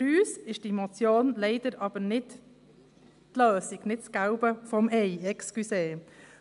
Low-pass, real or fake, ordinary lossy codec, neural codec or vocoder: 10.8 kHz; real; AAC, 96 kbps; none